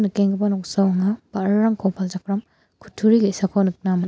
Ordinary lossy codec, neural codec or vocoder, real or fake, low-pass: none; none; real; none